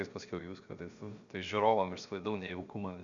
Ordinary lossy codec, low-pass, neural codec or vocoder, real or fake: Opus, 64 kbps; 7.2 kHz; codec, 16 kHz, about 1 kbps, DyCAST, with the encoder's durations; fake